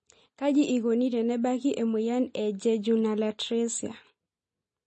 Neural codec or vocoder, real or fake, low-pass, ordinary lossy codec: none; real; 10.8 kHz; MP3, 32 kbps